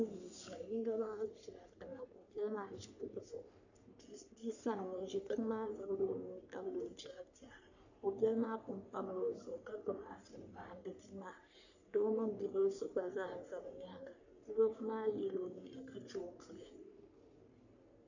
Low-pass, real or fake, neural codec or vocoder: 7.2 kHz; fake; codec, 44.1 kHz, 3.4 kbps, Pupu-Codec